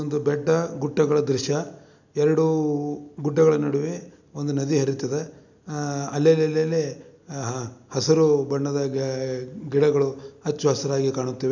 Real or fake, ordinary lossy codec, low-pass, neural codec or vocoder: real; none; 7.2 kHz; none